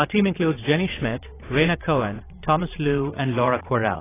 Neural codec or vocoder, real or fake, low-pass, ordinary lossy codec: vocoder, 22.05 kHz, 80 mel bands, WaveNeXt; fake; 3.6 kHz; AAC, 16 kbps